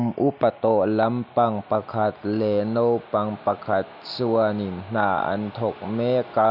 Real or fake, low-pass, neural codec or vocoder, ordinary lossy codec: real; 5.4 kHz; none; none